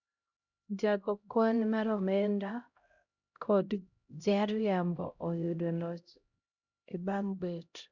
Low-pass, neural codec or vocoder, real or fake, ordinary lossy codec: 7.2 kHz; codec, 16 kHz, 0.5 kbps, X-Codec, HuBERT features, trained on LibriSpeech; fake; none